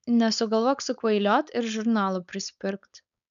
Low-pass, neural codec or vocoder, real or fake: 7.2 kHz; codec, 16 kHz, 4.8 kbps, FACodec; fake